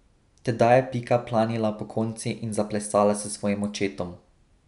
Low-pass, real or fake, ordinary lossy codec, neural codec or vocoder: 10.8 kHz; real; none; none